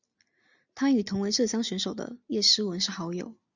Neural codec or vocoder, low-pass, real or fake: none; 7.2 kHz; real